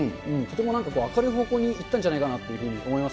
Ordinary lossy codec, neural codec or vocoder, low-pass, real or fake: none; none; none; real